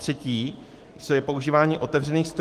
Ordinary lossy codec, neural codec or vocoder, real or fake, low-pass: Opus, 24 kbps; autoencoder, 48 kHz, 128 numbers a frame, DAC-VAE, trained on Japanese speech; fake; 14.4 kHz